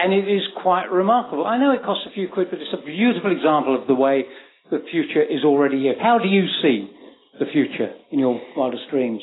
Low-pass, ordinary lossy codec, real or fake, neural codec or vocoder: 7.2 kHz; AAC, 16 kbps; real; none